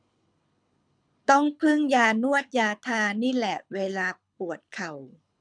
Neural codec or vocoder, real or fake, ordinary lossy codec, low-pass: codec, 24 kHz, 6 kbps, HILCodec; fake; MP3, 96 kbps; 9.9 kHz